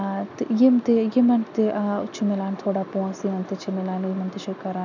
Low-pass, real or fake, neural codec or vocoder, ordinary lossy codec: 7.2 kHz; real; none; none